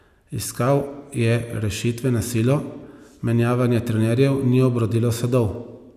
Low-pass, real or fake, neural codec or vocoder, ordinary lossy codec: 14.4 kHz; real; none; none